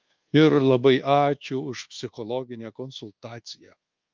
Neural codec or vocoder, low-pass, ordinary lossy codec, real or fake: codec, 24 kHz, 1.2 kbps, DualCodec; 7.2 kHz; Opus, 32 kbps; fake